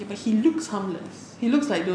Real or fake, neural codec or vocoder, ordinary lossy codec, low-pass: real; none; none; 9.9 kHz